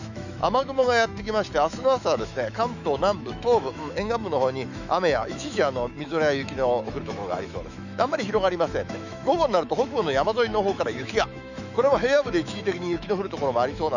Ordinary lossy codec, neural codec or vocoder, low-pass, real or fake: none; autoencoder, 48 kHz, 128 numbers a frame, DAC-VAE, trained on Japanese speech; 7.2 kHz; fake